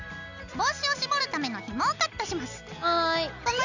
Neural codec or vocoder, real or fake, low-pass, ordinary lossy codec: none; real; 7.2 kHz; none